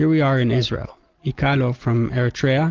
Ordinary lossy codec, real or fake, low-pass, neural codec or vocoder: Opus, 16 kbps; real; 7.2 kHz; none